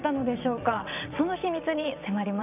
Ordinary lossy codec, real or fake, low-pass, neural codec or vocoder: none; fake; 3.6 kHz; vocoder, 22.05 kHz, 80 mel bands, WaveNeXt